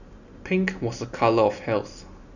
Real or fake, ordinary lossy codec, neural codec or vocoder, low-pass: real; none; none; 7.2 kHz